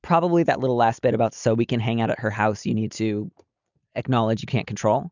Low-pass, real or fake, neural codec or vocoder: 7.2 kHz; real; none